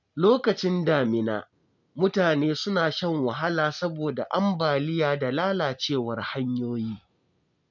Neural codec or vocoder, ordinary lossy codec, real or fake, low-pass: none; none; real; 7.2 kHz